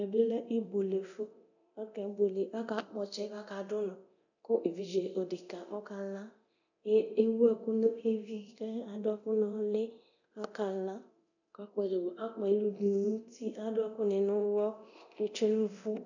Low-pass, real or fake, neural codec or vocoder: 7.2 kHz; fake; codec, 24 kHz, 0.9 kbps, DualCodec